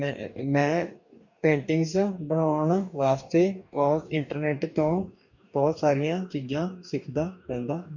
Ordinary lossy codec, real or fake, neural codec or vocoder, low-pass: none; fake; codec, 44.1 kHz, 2.6 kbps, DAC; 7.2 kHz